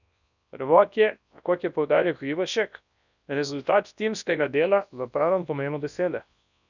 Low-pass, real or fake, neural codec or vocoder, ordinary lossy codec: 7.2 kHz; fake; codec, 24 kHz, 0.9 kbps, WavTokenizer, large speech release; none